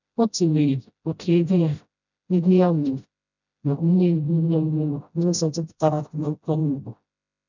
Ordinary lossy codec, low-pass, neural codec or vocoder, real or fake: none; 7.2 kHz; codec, 16 kHz, 0.5 kbps, FreqCodec, smaller model; fake